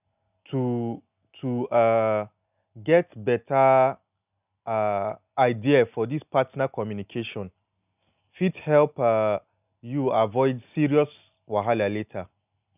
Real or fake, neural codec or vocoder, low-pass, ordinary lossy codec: real; none; 3.6 kHz; none